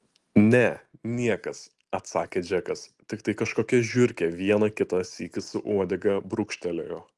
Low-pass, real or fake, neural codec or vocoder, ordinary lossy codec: 10.8 kHz; real; none; Opus, 24 kbps